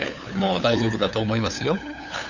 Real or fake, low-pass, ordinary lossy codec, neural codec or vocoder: fake; 7.2 kHz; none; codec, 16 kHz, 8 kbps, FunCodec, trained on LibriTTS, 25 frames a second